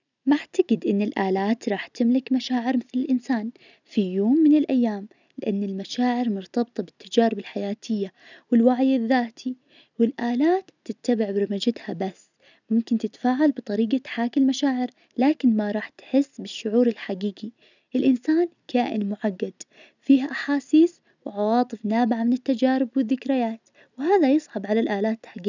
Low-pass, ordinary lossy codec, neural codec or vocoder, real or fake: 7.2 kHz; none; none; real